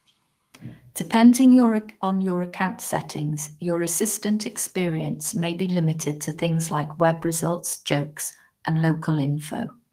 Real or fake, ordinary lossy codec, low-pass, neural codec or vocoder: fake; Opus, 32 kbps; 14.4 kHz; codec, 32 kHz, 1.9 kbps, SNAC